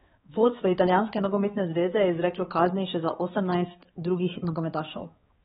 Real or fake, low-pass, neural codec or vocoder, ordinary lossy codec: fake; 7.2 kHz; codec, 16 kHz, 4 kbps, X-Codec, HuBERT features, trained on balanced general audio; AAC, 16 kbps